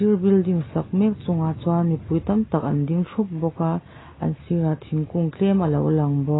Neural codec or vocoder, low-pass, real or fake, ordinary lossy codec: none; 7.2 kHz; real; AAC, 16 kbps